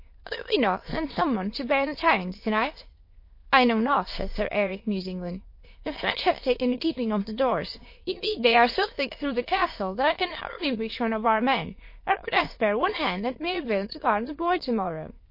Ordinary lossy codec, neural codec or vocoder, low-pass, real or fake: MP3, 32 kbps; autoencoder, 22.05 kHz, a latent of 192 numbers a frame, VITS, trained on many speakers; 5.4 kHz; fake